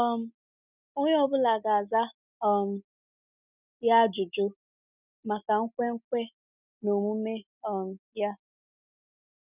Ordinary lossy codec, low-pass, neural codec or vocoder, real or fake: none; 3.6 kHz; none; real